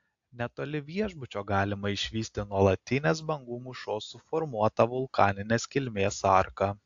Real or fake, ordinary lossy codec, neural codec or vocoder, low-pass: real; AAC, 64 kbps; none; 7.2 kHz